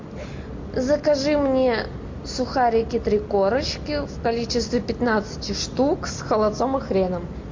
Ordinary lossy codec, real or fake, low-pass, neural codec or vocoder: MP3, 48 kbps; real; 7.2 kHz; none